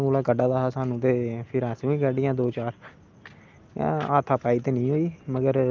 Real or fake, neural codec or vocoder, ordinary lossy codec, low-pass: real; none; Opus, 24 kbps; 7.2 kHz